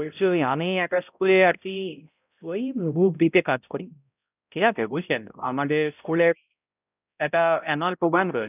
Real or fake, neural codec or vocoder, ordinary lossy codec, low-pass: fake; codec, 16 kHz, 0.5 kbps, X-Codec, HuBERT features, trained on balanced general audio; none; 3.6 kHz